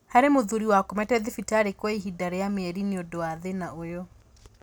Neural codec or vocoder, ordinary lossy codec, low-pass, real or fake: none; none; none; real